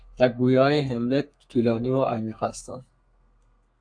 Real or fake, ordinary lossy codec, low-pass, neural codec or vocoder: fake; AAC, 64 kbps; 9.9 kHz; codec, 32 kHz, 1.9 kbps, SNAC